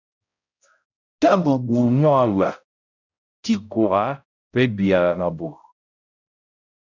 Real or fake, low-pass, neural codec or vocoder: fake; 7.2 kHz; codec, 16 kHz, 0.5 kbps, X-Codec, HuBERT features, trained on balanced general audio